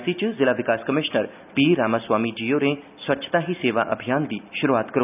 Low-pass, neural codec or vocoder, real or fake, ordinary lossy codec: 3.6 kHz; none; real; none